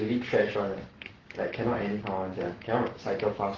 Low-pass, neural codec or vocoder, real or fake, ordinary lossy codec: 7.2 kHz; codec, 44.1 kHz, 7.8 kbps, Pupu-Codec; fake; Opus, 16 kbps